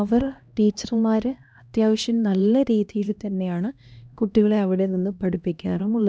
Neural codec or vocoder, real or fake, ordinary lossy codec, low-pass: codec, 16 kHz, 1 kbps, X-Codec, HuBERT features, trained on LibriSpeech; fake; none; none